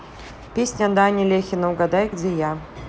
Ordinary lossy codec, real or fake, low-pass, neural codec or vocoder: none; real; none; none